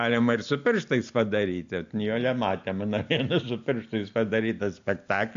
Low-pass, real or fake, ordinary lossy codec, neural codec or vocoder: 7.2 kHz; real; AAC, 64 kbps; none